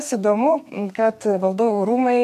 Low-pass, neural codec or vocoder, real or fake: 14.4 kHz; codec, 32 kHz, 1.9 kbps, SNAC; fake